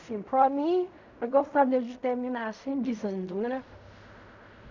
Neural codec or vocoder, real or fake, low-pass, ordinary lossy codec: codec, 16 kHz in and 24 kHz out, 0.4 kbps, LongCat-Audio-Codec, fine tuned four codebook decoder; fake; 7.2 kHz; none